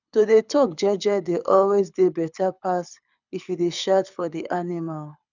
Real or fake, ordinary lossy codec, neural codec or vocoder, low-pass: fake; none; codec, 24 kHz, 6 kbps, HILCodec; 7.2 kHz